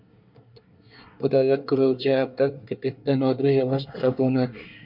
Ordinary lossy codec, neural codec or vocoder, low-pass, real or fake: MP3, 48 kbps; codec, 24 kHz, 1 kbps, SNAC; 5.4 kHz; fake